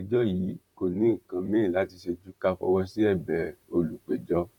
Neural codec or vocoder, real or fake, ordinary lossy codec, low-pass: vocoder, 44.1 kHz, 128 mel bands, Pupu-Vocoder; fake; none; 19.8 kHz